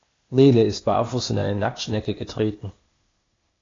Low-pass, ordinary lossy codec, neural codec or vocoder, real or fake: 7.2 kHz; AAC, 32 kbps; codec, 16 kHz, 0.8 kbps, ZipCodec; fake